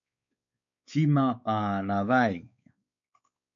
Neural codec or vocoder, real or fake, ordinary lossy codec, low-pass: codec, 16 kHz, 4 kbps, X-Codec, WavLM features, trained on Multilingual LibriSpeech; fake; MP3, 64 kbps; 7.2 kHz